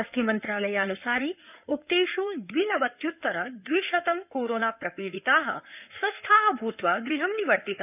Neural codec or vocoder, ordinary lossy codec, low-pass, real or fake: codec, 16 kHz in and 24 kHz out, 2.2 kbps, FireRedTTS-2 codec; none; 3.6 kHz; fake